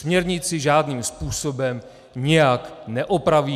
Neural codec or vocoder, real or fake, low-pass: none; real; 14.4 kHz